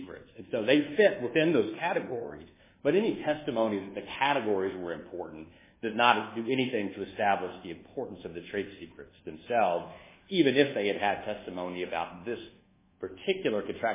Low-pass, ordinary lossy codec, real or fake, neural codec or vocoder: 3.6 kHz; MP3, 16 kbps; fake; codec, 24 kHz, 1.2 kbps, DualCodec